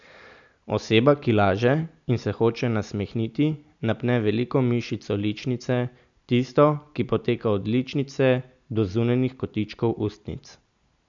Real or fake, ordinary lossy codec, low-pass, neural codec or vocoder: real; none; 7.2 kHz; none